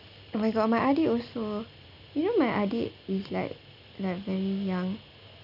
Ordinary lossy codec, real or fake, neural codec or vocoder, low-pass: none; real; none; 5.4 kHz